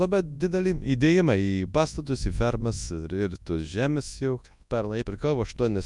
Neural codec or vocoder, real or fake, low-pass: codec, 24 kHz, 0.9 kbps, WavTokenizer, large speech release; fake; 10.8 kHz